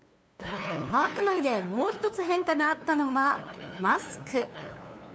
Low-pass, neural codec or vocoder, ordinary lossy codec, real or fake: none; codec, 16 kHz, 2 kbps, FunCodec, trained on LibriTTS, 25 frames a second; none; fake